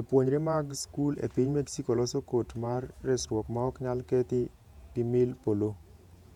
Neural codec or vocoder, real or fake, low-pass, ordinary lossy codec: vocoder, 48 kHz, 128 mel bands, Vocos; fake; 19.8 kHz; none